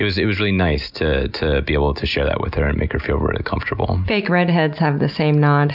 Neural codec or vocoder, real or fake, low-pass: none; real; 5.4 kHz